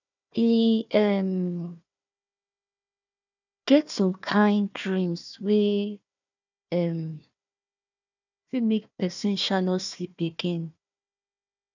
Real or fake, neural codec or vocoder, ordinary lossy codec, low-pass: fake; codec, 16 kHz, 1 kbps, FunCodec, trained on Chinese and English, 50 frames a second; none; 7.2 kHz